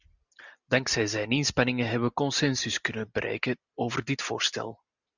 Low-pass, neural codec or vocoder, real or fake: 7.2 kHz; none; real